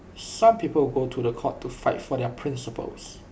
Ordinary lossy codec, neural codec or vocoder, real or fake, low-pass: none; none; real; none